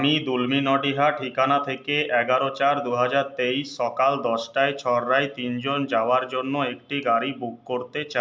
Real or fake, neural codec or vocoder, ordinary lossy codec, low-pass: real; none; none; none